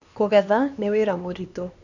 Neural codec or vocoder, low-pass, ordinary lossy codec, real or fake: codec, 16 kHz, 2 kbps, X-Codec, HuBERT features, trained on LibriSpeech; 7.2 kHz; none; fake